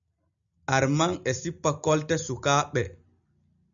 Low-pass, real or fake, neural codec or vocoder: 7.2 kHz; real; none